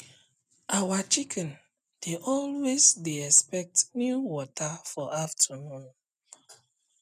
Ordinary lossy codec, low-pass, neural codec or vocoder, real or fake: none; 14.4 kHz; none; real